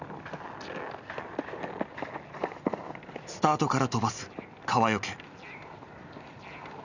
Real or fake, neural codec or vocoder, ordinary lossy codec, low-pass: real; none; none; 7.2 kHz